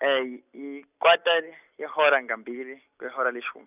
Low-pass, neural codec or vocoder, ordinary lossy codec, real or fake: 3.6 kHz; none; none; real